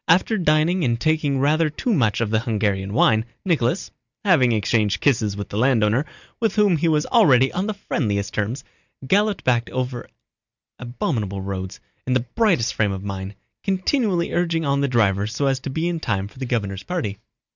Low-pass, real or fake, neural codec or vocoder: 7.2 kHz; real; none